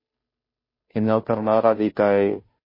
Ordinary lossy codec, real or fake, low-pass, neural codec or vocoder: MP3, 24 kbps; fake; 5.4 kHz; codec, 16 kHz, 0.5 kbps, FunCodec, trained on Chinese and English, 25 frames a second